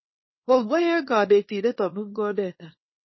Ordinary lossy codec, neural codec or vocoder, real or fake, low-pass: MP3, 24 kbps; codec, 24 kHz, 0.9 kbps, WavTokenizer, small release; fake; 7.2 kHz